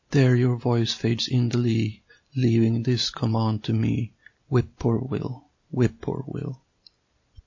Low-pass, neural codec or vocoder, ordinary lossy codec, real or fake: 7.2 kHz; none; MP3, 32 kbps; real